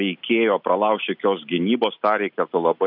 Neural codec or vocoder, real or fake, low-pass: none; real; 5.4 kHz